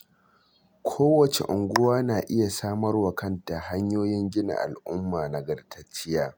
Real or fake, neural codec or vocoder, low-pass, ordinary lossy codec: real; none; none; none